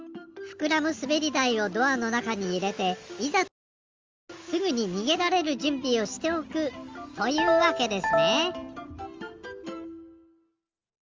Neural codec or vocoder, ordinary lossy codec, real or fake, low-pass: none; Opus, 32 kbps; real; 7.2 kHz